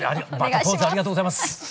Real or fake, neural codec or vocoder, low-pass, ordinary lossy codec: real; none; none; none